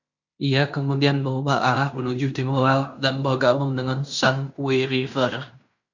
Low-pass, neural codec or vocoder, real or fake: 7.2 kHz; codec, 16 kHz in and 24 kHz out, 0.9 kbps, LongCat-Audio-Codec, fine tuned four codebook decoder; fake